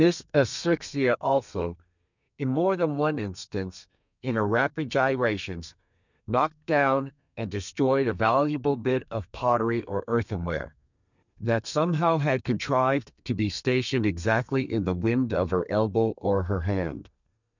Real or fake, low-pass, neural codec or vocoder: fake; 7.2 kHz; codec, 32 kHz, 1.9 kbps, SNAC